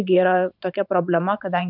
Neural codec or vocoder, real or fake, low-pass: vocoder, 44.1 kHz, 128 mel bands every 512 samples, BigVGAN v2; fake; 5.4 kHz